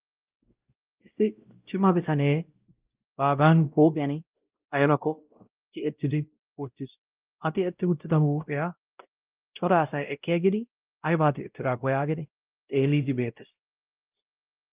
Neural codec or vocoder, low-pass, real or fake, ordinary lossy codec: codec, 16 kHz, 0.5 kbps, X-Codec, WavLM features, trained on Multilingual LibriSpeech; 3.6 kHz; fake; Opus, 32 kbps